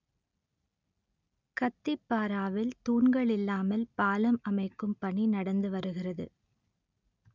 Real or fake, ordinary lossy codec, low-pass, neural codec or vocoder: real; none; 7.2 kHz; none